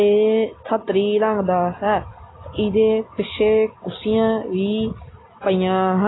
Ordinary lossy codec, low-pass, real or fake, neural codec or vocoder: AAC, 16 kbps; 7.2 kHz; real; none